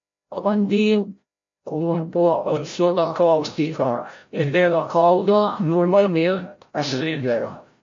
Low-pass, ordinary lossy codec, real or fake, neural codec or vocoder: 7.2 kHz; MP3, 48 kbps; fake; codec, 16 kHz, 0.5 kbps, FreqCodec, larger model